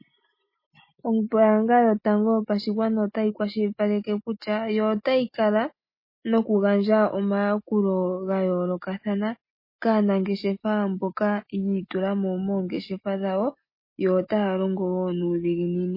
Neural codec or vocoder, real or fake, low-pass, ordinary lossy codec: none; real; 5.4 kHz; MP3, 24 kbps